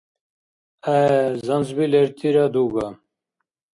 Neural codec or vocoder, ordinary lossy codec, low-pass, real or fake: none; MP3, 48 kbps; 10.8 kHz; real